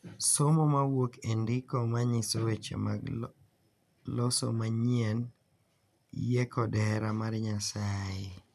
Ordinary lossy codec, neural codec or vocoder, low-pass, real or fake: none; none; 14.4 kHz; real